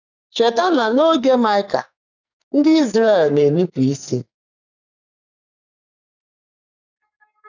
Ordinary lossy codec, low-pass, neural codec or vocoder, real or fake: none; 7.2 kHz; codec, 44.1 kHz, 2.6 kbps, SNAC; fake